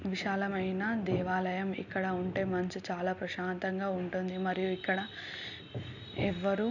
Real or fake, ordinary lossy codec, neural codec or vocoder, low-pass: real; none; none; 7.2 kHz